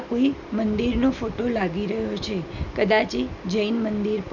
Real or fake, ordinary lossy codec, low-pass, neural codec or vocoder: real; none; 7.2 kHz; none